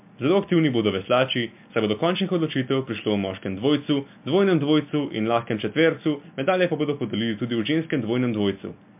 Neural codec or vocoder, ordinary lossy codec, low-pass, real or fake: none; MP3, 32 kbps; 3.6 kHz; real